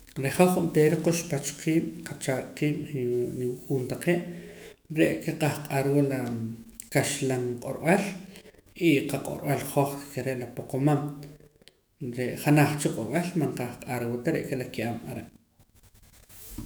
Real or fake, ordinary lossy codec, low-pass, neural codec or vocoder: fake; none; none; autoencoder, 48 kHz, 128 numbers a frame, DAC-VAE, trained on Japanese speech